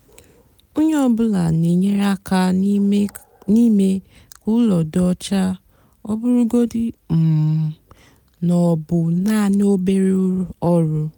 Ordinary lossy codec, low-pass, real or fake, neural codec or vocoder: none; none; real; none